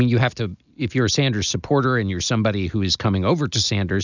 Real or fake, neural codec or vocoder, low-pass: real; none; 7.2 kHz